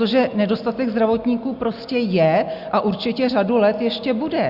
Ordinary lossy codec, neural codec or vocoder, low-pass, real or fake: Opus, 64 kbps; none; 5.4 kHz; real